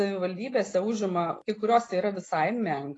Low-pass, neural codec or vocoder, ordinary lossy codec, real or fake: 10.8 kHz; none; AAC, 32 kbps; real